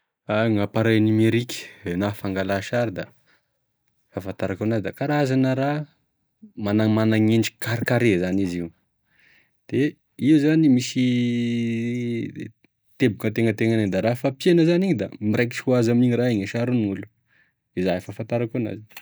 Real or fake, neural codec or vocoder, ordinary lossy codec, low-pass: real; none; none; none